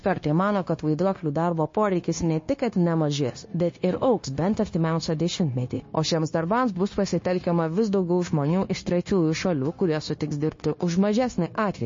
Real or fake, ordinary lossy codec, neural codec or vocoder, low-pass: fake; MP3, 32 kbps; codec, 16 kHz, 0.9 kbps, LongCat-Audio-Codec; 7.2 kHz